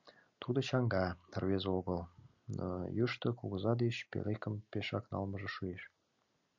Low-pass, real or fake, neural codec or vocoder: 7.2 kHz; real; none